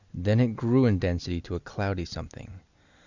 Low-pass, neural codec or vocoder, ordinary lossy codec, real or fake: 7.2 kHz; none; Opus, 64 kbps; real